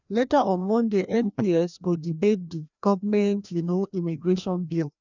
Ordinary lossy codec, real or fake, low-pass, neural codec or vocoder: none; fake; 7.2 kHz; codec, 16 kHz, 1 kbps, FreqCodec, larger model